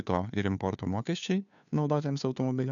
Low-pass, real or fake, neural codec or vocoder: 7.2 kHz; fake; codec, 16 kHz, 2 kbps, FunCodec, trained on Chinese and English, 25 frames a second